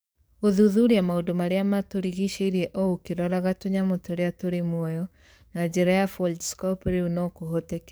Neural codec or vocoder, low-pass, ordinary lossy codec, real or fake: codec, 44.1 kHz, 7.8 kbps, DAC; none; none; fake